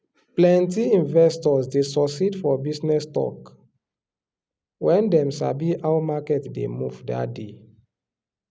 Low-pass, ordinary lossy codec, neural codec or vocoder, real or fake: none; none; none; real